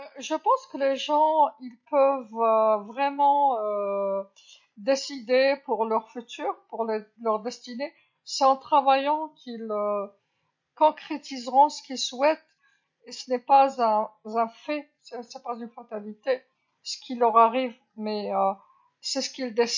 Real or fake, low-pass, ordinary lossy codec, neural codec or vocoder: real; 7.2 kHz; MP3, 48 kbps; none